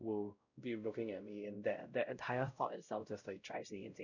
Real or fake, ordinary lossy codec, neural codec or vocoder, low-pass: fake; none; codec, 16 kHz, 0.5 kbps, X-Codec, WavLM features, trained on Multilingual LibriSpeech; 7.2 kHz